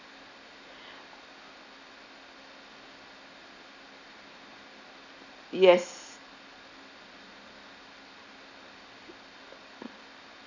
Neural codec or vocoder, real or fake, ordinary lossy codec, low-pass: none; real; none; 7.2 kHz